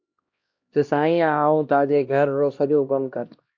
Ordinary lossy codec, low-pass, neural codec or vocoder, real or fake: MP3, 48 kbps; 7.2 kHz; codec, 16 kHz, 1 kbps, X-Codec, HuBERT features, trained on LibriSpeech; fake